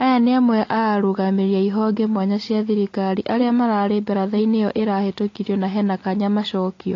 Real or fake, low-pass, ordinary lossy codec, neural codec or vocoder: real; 7.2 kHz; AAC, 32 kbps; none